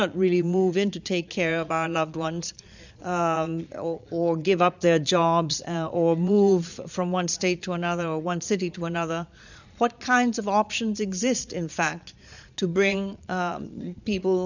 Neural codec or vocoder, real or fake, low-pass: vocoder, 22.05 kHz, 80 mel bands, Vocos; fake; 7.2 kHz